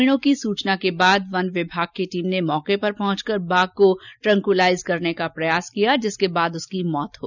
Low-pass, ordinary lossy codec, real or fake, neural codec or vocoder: 7.2 kHz; none; real; none